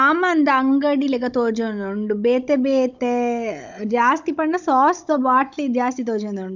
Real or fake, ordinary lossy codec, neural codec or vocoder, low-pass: fake; none; codec, 16 kHz, 16 kbps, FunCodec, trained on Chinese and English, 50 frames a second; 7.2 kHz